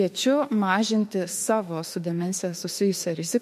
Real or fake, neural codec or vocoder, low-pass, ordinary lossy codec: fake; autoencoder, 48 kHz, 32 numbers a frame, DAC-VAE, trained on Japanese speech; 14.4 kHz; MP3, 64 kbps